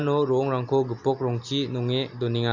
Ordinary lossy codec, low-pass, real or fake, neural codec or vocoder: Opus, 64 kbps; 7.2 kHz; real; none